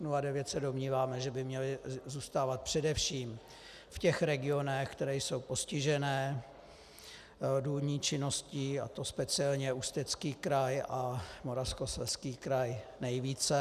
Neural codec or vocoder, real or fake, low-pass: none; real; 14.4 kHz